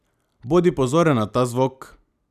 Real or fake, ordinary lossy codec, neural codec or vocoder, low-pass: real; none; none; 14.4 kHz